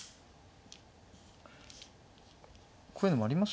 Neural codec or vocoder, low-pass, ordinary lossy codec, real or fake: none; none; none; real